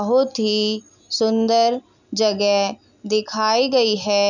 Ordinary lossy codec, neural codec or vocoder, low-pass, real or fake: none; none; 7.2 kHz; real